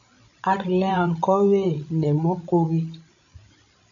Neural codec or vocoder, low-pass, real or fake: codec, 16 kHz, 16 kbps, FreqCodec, larger model; 7.2 kHz; fake